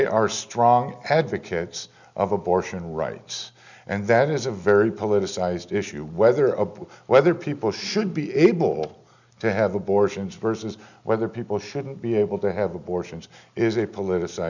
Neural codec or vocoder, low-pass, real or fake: none; 7.2 kHz; real